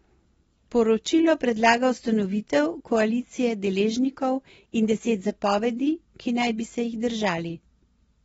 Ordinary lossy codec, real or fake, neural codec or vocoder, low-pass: AAC, 24 kbps; real; none; 19.8 kHz